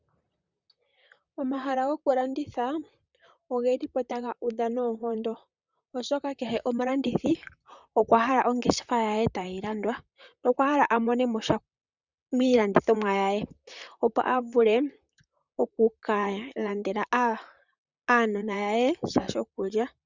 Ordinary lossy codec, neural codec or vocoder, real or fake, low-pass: Opus, 64 kbps; vocoder, 44.1 kHz, 128 mel bands, Pupu-Vocoder; fake; 7.2 kHz